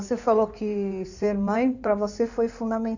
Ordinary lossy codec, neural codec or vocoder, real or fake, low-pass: none; codec, 16 kHz in and 24 kHz out, 2.2 kbps, FireRedTTS-2 codec; fake; 7.2 kHz